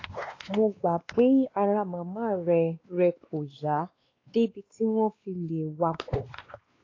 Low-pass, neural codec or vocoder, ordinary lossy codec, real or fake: 7.2 kHz; codec, 16 kHz, 2 kbps, X-Codec, WavLM features, trained on Multilingual LibriSpeech; AAC, 32 kbps; fake